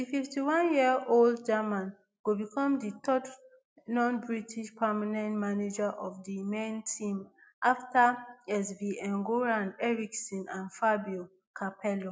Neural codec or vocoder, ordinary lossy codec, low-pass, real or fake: none; none; none; real